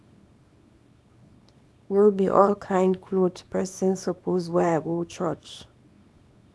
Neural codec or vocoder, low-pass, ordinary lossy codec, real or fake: codec, 24 kHz, 0.9 kbps, WavTokenizer, small release; none; none; fake